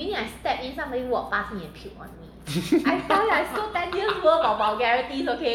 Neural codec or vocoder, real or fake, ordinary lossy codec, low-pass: none; real; none; 19.8 kHz